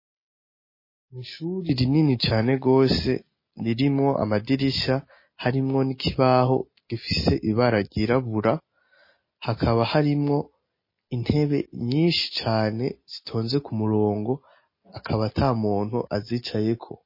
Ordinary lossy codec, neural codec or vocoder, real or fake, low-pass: MP3, 24 kbps; none; real; 5.4 kHz